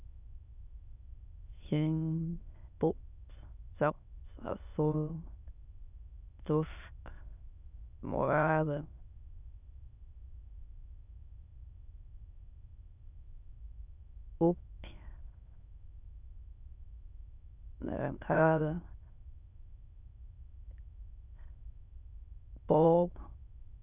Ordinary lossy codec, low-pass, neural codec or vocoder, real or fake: none; 3.6 kHz; autoencoder, 22.05 kHz, a latent of 192 numbers a frame, VITS, trained on many speakers; fake